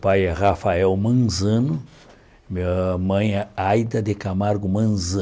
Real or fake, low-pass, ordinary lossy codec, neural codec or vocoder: real; none; none; none